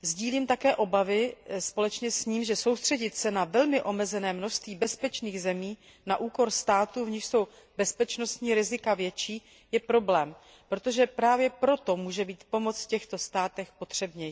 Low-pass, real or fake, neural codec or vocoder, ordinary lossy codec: none; real; none; none